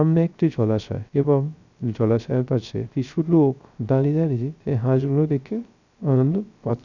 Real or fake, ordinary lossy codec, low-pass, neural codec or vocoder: fake; none; 7.2 kHz; codec, 16 kHz, 0.3 kbps, FocalCodec